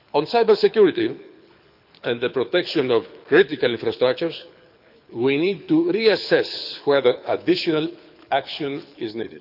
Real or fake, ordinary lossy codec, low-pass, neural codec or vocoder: fake; none; 5.4 kHz; codec, 24 kHz, 6 kbps, HILCodec